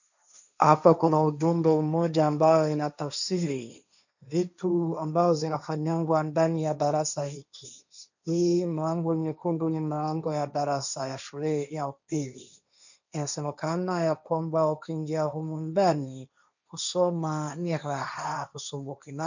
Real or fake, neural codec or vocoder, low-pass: fake; codec, 16 kHz, 1.1 kbps, Voila-Tokenizer; 7.2 kHz